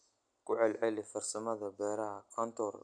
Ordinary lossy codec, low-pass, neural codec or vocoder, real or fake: none; 9.9 kHz; none; real